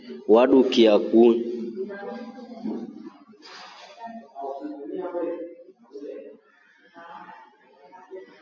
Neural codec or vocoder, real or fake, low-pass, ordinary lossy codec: none; real; 7.2 kHz; AAC, 48 kbps